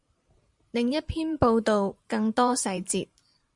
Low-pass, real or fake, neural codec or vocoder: 10.8 kHz; fake; vocoder, 44.1 kHz, 128 mel bands every 512 samples, BigVGAN v2